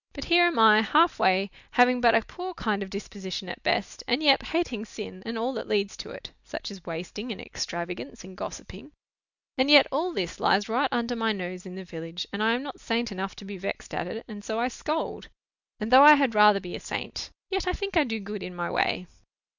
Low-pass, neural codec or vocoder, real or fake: 7.2 kHz; none; real